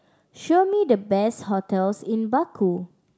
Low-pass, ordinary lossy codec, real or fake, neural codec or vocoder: none; none; real; none